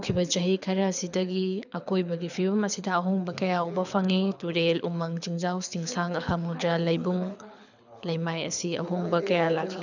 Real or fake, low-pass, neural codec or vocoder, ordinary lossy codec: fake; 7.2 kHz; codec, 24 kHz, 6 kbps, HILCodec; none